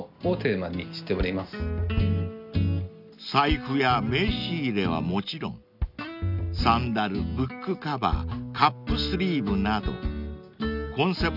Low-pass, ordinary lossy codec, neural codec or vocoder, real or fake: 5.4 kHz; none; none; real